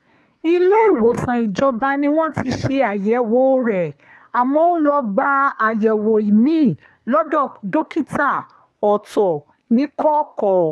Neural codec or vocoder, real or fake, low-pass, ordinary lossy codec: codec, 24 kHz, 1 kbps, SNAC; fake; none; none